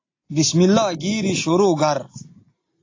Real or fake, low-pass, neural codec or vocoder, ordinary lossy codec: real; 7.2 kHz; none; AAC, 32 kbps